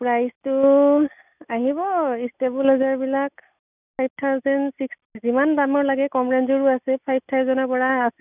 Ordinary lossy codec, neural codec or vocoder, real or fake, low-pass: none; none; real; 3.6 kHz